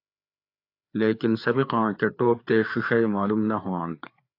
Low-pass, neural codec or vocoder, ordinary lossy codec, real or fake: 5.4 kHz; codec, 16 kHz, 4 kbps, FreqCodec, larger model; AAC, 32 kbps; fake